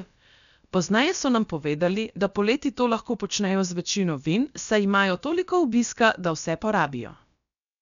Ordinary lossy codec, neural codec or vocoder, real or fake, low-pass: none; codec, 16 kHz, about 1 kbps, DyCAST, with the encoder's durations; fake; 7.2 kHz